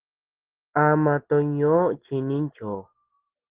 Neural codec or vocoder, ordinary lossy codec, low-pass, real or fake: none; Opus, 16 kbps; 3.6 kHz; real